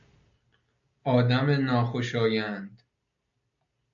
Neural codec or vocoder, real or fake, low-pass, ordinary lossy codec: none; real; 7.2 kHz; AAC, 64 kbps